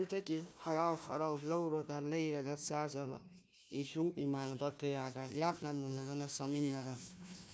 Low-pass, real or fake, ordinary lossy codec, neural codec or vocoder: none; fake; none; codec, 16 kHz, 1 kbps, FunCodec, trained on Chinese and English, 50 frames a second